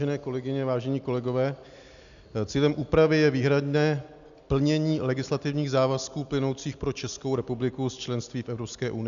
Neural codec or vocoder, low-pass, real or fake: none; 7.2 kHz; real